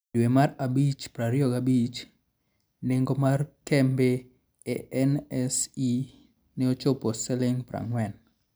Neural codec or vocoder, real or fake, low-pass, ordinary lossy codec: none; real; none; none